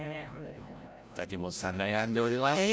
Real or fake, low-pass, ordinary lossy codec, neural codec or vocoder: fake; none; none; codec, 16 kHz, 0.5 kbps, FreqCodec, larger model